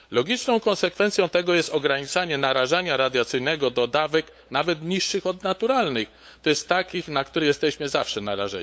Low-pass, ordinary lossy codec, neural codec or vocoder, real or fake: none; none; codec, 16 kHz, 8 kbps, FunCodec, trained on LibriTTS, 25 frames a second; fake